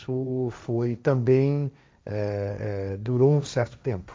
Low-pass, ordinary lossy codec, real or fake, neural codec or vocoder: none; none; fake; codec, 16 kHz, 1.1 kbps, Voila-Tokenizer